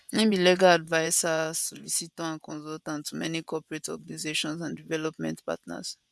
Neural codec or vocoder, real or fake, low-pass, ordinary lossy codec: vocoder, 24 kHz, 100 mel bands, Vocos; fake; none; none